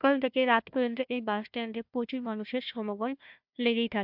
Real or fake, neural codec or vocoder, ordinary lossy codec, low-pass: fake; codec, 16 kHz, 1 kbps, FunCodec, trained on Chinese and English, 50 frames a second; MP3, 48 kbps; 5.4 kHz